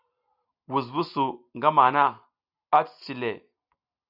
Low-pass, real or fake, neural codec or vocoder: 5.4 kHz; real; none